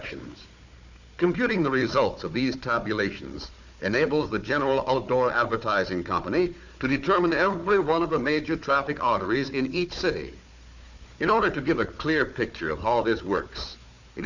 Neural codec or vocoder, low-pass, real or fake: codec, 16 kHz, 4 kbps, FunCodec, trained on Chinese and English, 50 frames a second; 7.2 kHz; fake